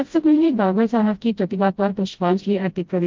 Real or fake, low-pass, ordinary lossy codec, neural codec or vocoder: fake; 7.2 kHz; Opus, 24 kbps; codec, 16 kHz, 0.5 kbps, FreqCodec, smaller model